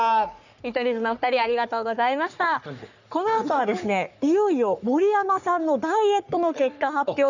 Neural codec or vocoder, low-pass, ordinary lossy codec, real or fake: codec, 44.1 kHz, 3.4 kbps, Pupu-Codec; 7.2 kHz; none; fake